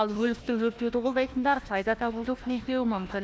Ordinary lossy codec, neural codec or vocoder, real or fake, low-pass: none; codec, 16 kHz, 1 kbps, FunCodec, trained on Chinese and English, 50 frames a second; fake; none